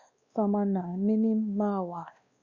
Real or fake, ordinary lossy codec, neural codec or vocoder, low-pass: fake; Opus, 64 kbps; codec, 16 kHz, 1 kbps, X-Codec, WavLM features, trained on Multilingual LibriSpeech; 7.2 kHz